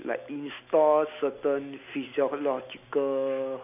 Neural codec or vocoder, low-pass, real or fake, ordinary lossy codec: none; 3.6 kHz; real; none